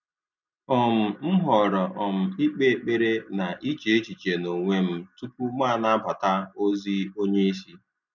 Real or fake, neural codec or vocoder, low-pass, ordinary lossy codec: real; none; 7.2 kHz; none